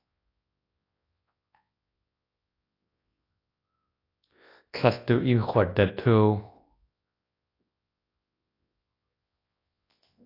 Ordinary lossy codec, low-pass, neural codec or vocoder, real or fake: AAC, 32 kbps; 5.4 kHz; codec, 24 kHz, 0.9 kbps, WavTokenizer, large speech release; fake